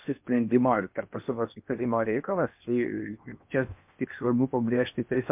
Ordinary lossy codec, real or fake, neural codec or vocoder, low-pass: MP3, 32 kbps; fake; codec, 16 kHz in and 24 kHz out, 0.6 kbps, FocalCodec, streaming, 4096 codes; 3.6 kHz